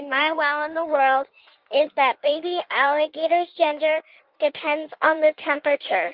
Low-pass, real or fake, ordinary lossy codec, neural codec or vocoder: 5.4 kHz; fake; Opus, 24 kbps; codec, 16 kHz in and 24 kHz out, 1.1 kbps, FireRedTTS-2 codec